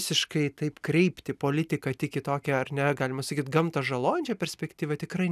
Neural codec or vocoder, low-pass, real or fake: none; 14.4 kHz; real